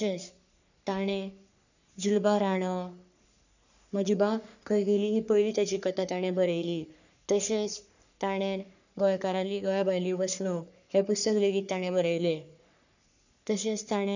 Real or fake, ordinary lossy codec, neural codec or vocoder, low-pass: fake; none; codec, 44.1 kHz, 3.4 kbps, Pupu-Codec; 7.2 kHz